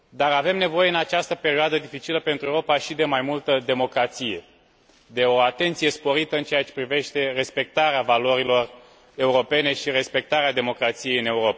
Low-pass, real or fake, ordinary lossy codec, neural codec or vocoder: none; real; none; none